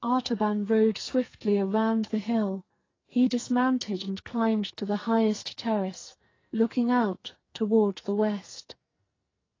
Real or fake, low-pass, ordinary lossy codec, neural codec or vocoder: fake; 7.2 kHz; AAC, 32 kbps; codec, 44.1 kHz, 2.6 kbps, SNAC